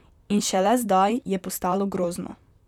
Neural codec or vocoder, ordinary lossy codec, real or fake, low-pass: vocoder, 44.1 kHz, 128 mel bands, Pupu-Vocoder; none; fake; 19.8 kHz